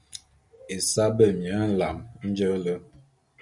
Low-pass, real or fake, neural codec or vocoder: 10.8 kHz; real; none